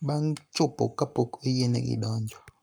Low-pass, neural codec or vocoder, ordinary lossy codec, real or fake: none; codec, 44.1 kHz, 7.8 kbps, Pupu-Codec; none; fake